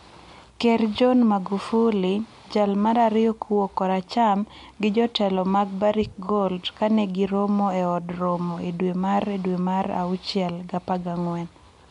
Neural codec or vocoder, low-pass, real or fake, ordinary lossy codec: none; 10.8 kHz; real; MP3, 64 kbps